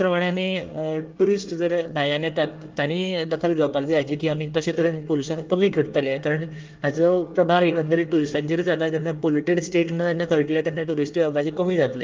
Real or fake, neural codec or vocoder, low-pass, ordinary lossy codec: fake; codec, 24 kHz, 1 kbps, SNAC; 7.2 kHz; Opus, 24 kbps